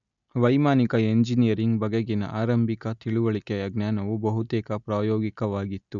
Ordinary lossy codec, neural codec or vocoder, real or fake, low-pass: none; none; real; 7.2 kHz